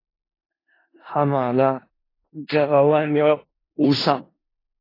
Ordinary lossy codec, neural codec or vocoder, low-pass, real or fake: AAC, 24 kbps; codec, 16 kHz in and 24 kHz out, 0.4 kbps, LongCat-Audio-Codec, four codebook decoder; 5.4 kHz; fake